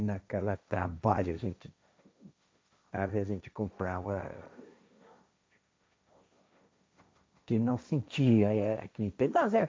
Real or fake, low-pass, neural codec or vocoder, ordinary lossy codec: fake; none; codec, 16 kHz, 1.1 kbps, Voila-Tokenizer; none